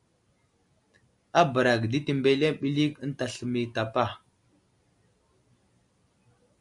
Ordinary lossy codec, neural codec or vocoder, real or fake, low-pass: MP3, 96 kbps; none; real; 10.8 kHz